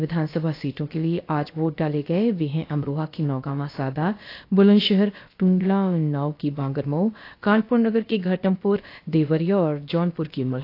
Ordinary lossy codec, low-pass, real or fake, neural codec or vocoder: AAC, 32 kbps; 5.4 kHz; fake; codec, 16 kHz, about 1 kbps, DyCAST, with the encoder's durations